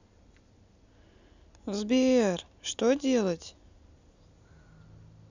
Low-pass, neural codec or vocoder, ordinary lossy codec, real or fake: 7.2 kHz; none; none; real